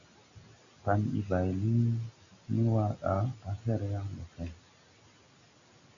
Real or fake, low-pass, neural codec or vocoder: real; 7.2 kHz; none